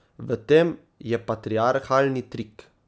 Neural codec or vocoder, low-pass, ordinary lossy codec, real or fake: none; none; none; real